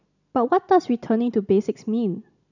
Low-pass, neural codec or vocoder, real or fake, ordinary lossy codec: 7.2 kHz; none; real; none